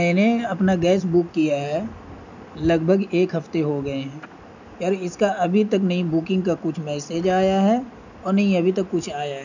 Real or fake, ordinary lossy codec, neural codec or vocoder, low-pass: real; none; none; 7.2 kHz